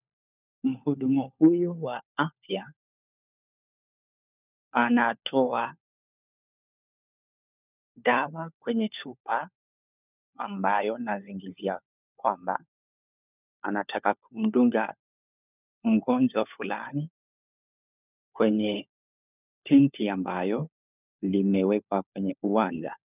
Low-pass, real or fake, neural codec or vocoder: 3.6 kHz; fake; codec, 16 kHz, 4 kbps, FunCodec, trained on LibriTTS, 50 frames a second